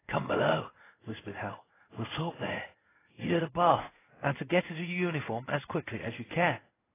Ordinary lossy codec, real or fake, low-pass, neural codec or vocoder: AAC, 16 kbps; fake; 3.6 kHz; codec, 16 kHz in and 24 kHz out, 1 kbps, XY-Tokenizer